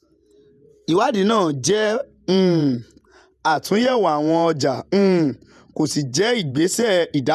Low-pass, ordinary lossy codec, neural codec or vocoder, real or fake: 14.4 kHz; none; vocoder, 48 kHz, 128 mel bands, Vocos; fake